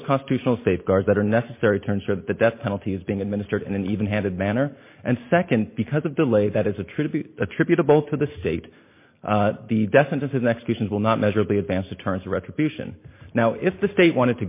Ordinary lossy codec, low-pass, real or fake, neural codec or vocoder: MP3, 24 kbps; 3.6 kHz; real; none